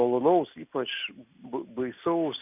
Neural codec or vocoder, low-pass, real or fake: none; 3.6 kHz; real